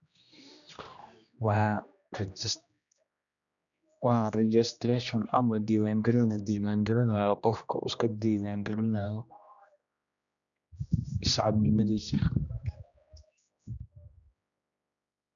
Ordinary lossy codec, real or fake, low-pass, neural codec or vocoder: none; fake; 7.2 kHz; codec, 16 kHz, 1 kbps, X-Codec, HuBERT features, trained on general audio